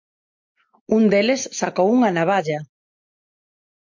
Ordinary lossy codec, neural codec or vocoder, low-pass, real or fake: MP3, 48 kbps; none; 7.2 kHz; real